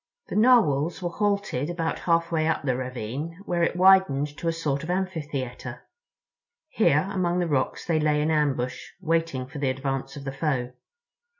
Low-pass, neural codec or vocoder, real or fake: 7.2 kHz; none; real